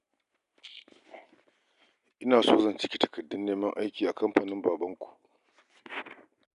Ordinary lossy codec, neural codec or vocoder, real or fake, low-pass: none; none; real; 10.8 kHz